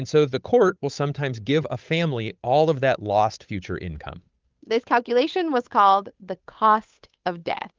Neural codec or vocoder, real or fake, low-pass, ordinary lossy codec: codec, 16 kHz, 8 kbps, FunCodec, trained on Chinese and English, 25 frames a second; fake; 7.2 kHz; Opus, 32 kbps